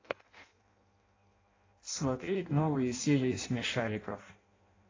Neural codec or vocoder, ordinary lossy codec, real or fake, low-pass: codec, 16 kHz in and 24 kHz out, 0.6 kbps, FireRedTTS-2 codec; AAC, 32 kbps; fake; 7.2 kHz